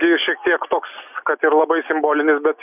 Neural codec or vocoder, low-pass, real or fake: none; 3.6 kHz; real